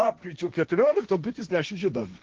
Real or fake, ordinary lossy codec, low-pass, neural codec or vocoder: fake; Opus, 16 kbps; 7.2 kHz; codec, 16 kHz, 1.1 kbps, Voila-Tokenizer